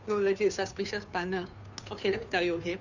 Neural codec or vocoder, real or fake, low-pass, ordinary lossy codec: codec, 16 kHz, 2 kbps, FunCodec, trained on Chinese and English, 25 frames a second; fake; 7.2 kHz; none